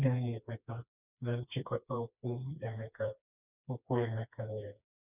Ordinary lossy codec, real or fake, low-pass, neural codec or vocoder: none; fake; 3.6 kHz; codec, 16 kHz, 2 kbps, FreqCodec, smaller model